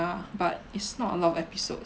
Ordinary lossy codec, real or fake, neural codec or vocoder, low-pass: none; real; none; none